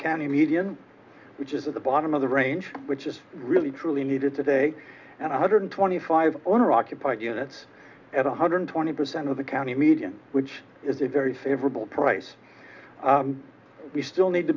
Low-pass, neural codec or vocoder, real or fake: 7.2 kHz; none; real